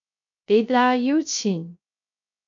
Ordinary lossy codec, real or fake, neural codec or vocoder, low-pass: AAC, 64 kbps; fake; codec, 16 kHz, 0.3 kbps, FocalCodec; 7.2 kHz